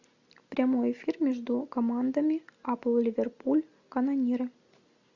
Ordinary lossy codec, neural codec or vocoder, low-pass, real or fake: Opus, 64 kbps; none; 7.2 kHz; real